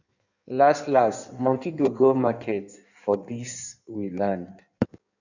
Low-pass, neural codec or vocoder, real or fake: 7.2 kHz; codec, 16 kHz in and 24 kHz out, 1.1 kbps, FireRedTTS-2 codec; fake